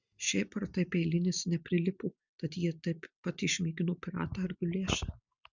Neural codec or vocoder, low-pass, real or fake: none; 7.2 kHz; real